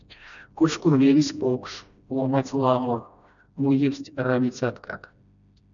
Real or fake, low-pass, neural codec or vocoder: fake; 7.2 kHz; codec, 16 kHz, 1 kbps, FreqCodec, smaller model